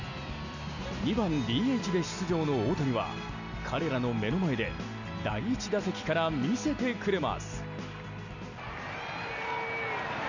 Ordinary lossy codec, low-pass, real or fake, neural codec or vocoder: none; 7.2 kHz; real; none